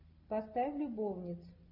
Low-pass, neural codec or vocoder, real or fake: 5.4 kHz; none; real